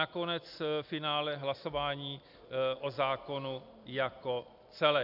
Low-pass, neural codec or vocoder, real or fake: 5.4 kHz; none; real